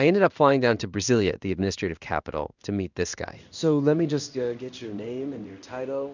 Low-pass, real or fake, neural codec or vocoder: 7.2 kHz; fake; codec, 16 kHz in and 24 kHz out, 1 kbps, XY-Tokenizer